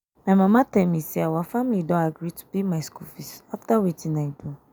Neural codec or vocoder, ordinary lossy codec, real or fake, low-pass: none; none; real; none